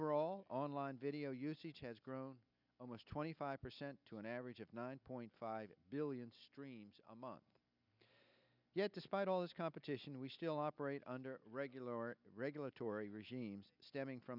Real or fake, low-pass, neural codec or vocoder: real; 5.4 kHz; none